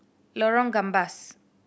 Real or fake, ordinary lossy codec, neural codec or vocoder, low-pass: real; none; none; none